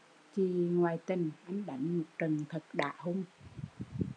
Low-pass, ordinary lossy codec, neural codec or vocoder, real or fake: 9.9 kHz; MP3, 96 kbps; none; real